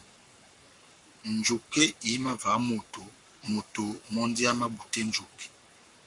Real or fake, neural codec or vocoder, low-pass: fake; codec, 44.1 kHz, 7.8 kbps, Pupu-Codec; 10.8 kHz